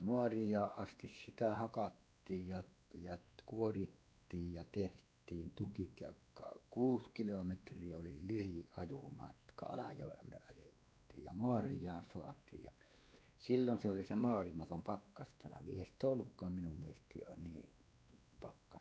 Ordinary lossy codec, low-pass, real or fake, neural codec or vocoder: none; none; fake; codec, 16 kHz, 2 kbps, X-Codec, WavLM features, trained on Multilingual LibriSpeech